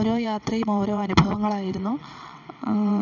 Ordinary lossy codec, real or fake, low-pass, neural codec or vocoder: none; fake; 7.2 kHz; vocoder, 22.05 kHz, 80 mel bands, WaveNeXt